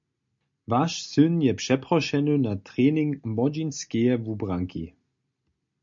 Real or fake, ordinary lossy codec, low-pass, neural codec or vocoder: real; MP3, 48 kbps; 7.2 kHz; none